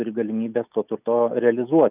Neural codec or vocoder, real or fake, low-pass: none; real; 3.6 kHz